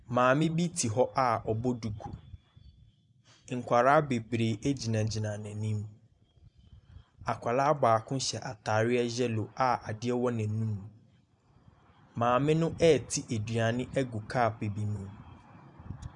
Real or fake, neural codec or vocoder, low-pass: fake; vocoder, 44.1 kHz, 128 mel bands every 512 samples, BigVGAN v2; 10.8 kHz